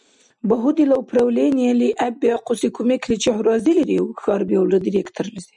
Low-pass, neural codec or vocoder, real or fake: 10.8 kHz; none; real